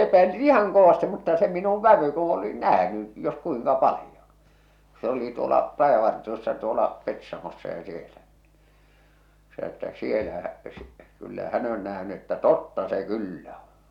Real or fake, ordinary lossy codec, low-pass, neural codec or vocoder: real; none; 19.8 kHz; none